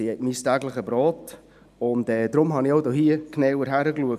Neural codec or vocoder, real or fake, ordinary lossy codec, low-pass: none; real; none; 14.4 kHz